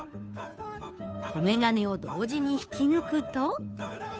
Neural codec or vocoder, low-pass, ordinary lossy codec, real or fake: codec, 16 kHz, 2 kbps, FunCodec, trained on Chinese and English, 25 frames a second; none; none; fake